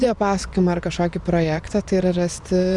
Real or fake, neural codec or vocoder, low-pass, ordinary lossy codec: fake; vocoder, 24 kHz, 100 mel bands, Vocos; 10.8 kHz; Opus, 64 kbps